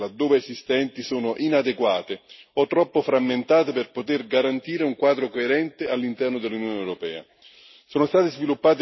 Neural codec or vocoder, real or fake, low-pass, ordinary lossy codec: none; real; 7.2 kHz; MP3, 24 kbps